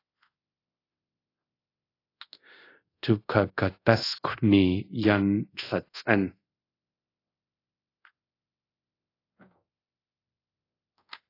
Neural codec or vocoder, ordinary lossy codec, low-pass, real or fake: codec, 24 kHz, 0.5 kbps, DualCodec; AAC, 32 kbps; 5.4 kHz; fake